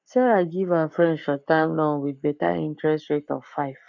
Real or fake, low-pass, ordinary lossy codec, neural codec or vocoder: fake; 7.2 kHz; none; codec, 44.1 kHz, 7.8 kbps, Pupu-Codec